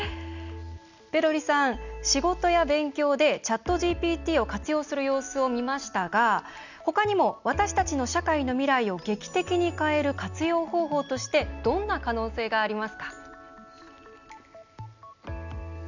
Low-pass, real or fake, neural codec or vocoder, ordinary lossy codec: 7.2 kHz; real; none; none